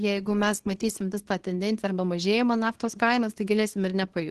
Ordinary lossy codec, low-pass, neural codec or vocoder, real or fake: Opus, 16 kbps; 10.8 kHz; codec, 24 kHz, 0.9 kbps, WavTokenizer, small release; fake